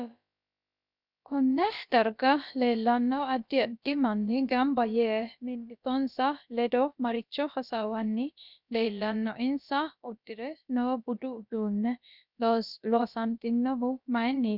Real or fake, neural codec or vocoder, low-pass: fake; codec, 16 kHz, about 1 kbps, DyCAST, with the encoder's durations; 5.4 kHz